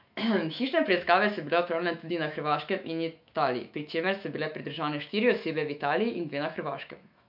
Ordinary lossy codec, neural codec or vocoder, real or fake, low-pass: MP3, 48 kbps; autoencoder, 48 kHz, 128 numbers a frame, DAC-VAE, trained on Japanese speech; fake; 5.4 kHz